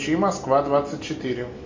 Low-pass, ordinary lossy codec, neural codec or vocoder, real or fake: 7.2 kHz; MP3, 48 kbps; none; real